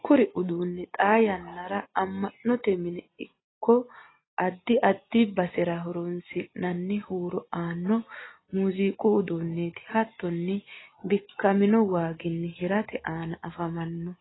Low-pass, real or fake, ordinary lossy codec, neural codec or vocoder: 7.2 kHz; real; AAC, 16 kbps; none